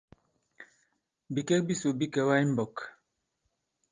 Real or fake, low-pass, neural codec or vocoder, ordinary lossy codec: real; 7.2 kHz; none; Opus, 32 kbps